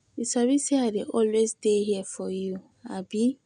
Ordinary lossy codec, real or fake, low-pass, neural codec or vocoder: none; real; 9.9 kHz; none